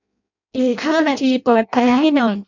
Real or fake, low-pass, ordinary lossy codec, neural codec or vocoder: fake; 7.2 kHz; none; codec, 16 kHz in and 24 kHz out, 0.6 kbps, FireRedTTS-2 codec